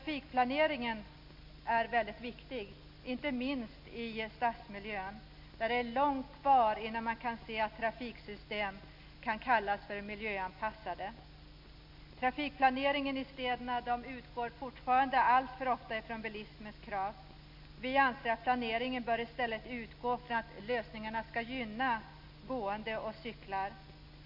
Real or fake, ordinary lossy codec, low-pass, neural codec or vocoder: real; MP3, 48 kbps; 5.4 kHz; none